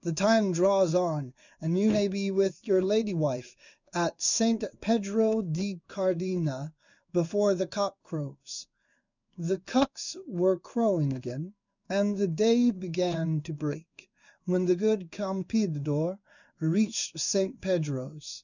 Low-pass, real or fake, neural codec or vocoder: 7.2 kHz; fake; codec, 16 kHz in and 24 kHz out, 1 kbps, XY-Tokenizer